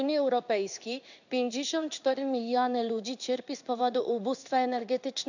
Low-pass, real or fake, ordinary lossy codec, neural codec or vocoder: 7.2 kHz; fake; none; codec, 16 kHz in and 24 kHz out, 1 kbps, XY-Tokenizer